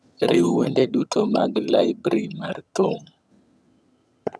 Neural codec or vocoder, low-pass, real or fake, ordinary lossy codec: vocoder, 22.05 kHz, 80 mel bands, HiFi-GAN; none; fake; none